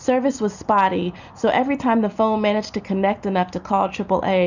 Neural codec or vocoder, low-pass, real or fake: none; 7.2 kHz; real